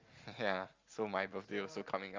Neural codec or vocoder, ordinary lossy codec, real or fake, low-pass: none; Opus, 32 kbps; real; 7.2 kHz